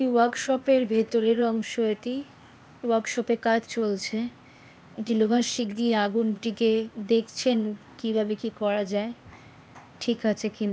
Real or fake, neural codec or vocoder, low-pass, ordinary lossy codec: fake; codec, 16 kHz, 0.8 kbps, ZipCodec; none; none